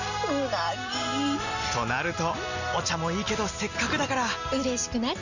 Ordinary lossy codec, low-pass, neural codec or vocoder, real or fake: none; 7.2 kHz; none; real